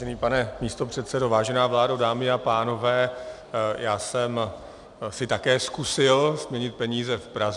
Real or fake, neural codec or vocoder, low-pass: real; none; 10.8 kHz